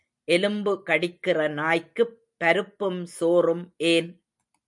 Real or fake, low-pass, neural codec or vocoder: real; 10.8 kHz; none